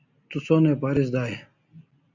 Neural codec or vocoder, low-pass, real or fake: none; 7.2 kHz; real